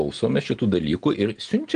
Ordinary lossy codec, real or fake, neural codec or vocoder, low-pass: Opus, 24 kbps; real; none; 9.9 kHz